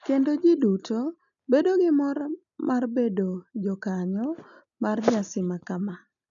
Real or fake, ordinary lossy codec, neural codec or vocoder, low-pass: real; none; none; 7.2 kHz